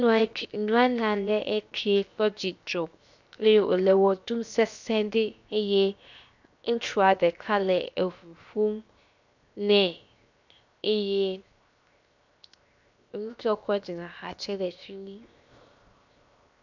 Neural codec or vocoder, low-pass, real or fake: codec, 16 kHz, 0.7 kbps, FocalCodec; 7.2 kHz; fake